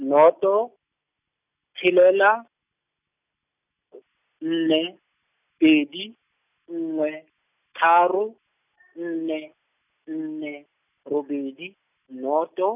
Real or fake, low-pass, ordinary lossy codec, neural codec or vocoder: real; 3.6 kHz; none; none